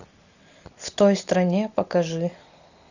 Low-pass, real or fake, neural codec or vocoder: 7.2 kHz; real; none